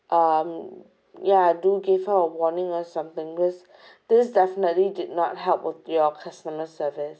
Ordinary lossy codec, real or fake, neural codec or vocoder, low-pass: none; real; none; none